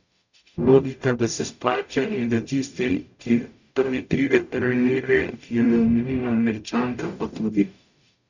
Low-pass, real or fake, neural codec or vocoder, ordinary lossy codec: 7.2 kHz; fake; codec, 44.1 kHz, 0.9 kbps, DAC; none